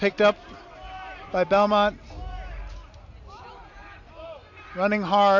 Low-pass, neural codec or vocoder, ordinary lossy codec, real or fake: 7.2 kHz; none; AAC, 48 kbps; real